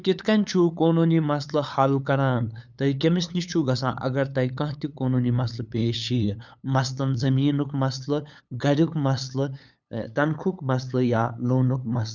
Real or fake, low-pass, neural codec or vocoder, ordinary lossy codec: fake; 7.2 kHz; codec, 16 kHz, 8 kbps, FunCodec, trained on LibriTTS, 25 frames a second; none